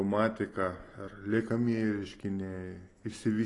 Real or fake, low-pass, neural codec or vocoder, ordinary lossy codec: real; 10.8 kHz; none; AAC, 32 kbps